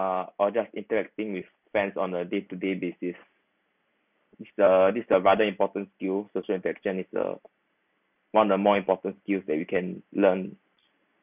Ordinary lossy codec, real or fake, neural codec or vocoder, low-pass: none; real; none; 3.6 kHz